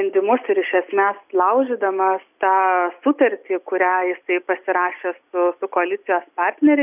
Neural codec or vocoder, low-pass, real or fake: none; 3.6 kHz; real